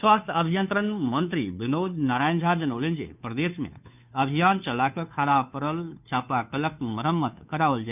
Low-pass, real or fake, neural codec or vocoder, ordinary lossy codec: 3.6 kHz; fake; codec, 16 kHz, 2 kbps, FunCodec, trained on Chinese and English, 25 frames a second; none